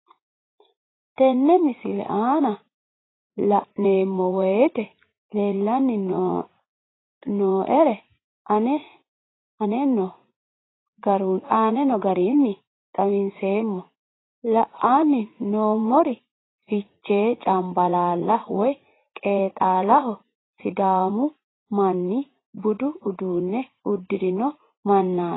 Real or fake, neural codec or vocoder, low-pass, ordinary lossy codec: fake; vocoder, 44.1 kHz, 80 mel bands, Vocos; 7.2 kHz; AAC, 16 kbps